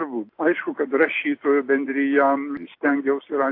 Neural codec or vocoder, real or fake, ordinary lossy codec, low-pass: none; real; AAC, 32 kbps; 5.4 kHz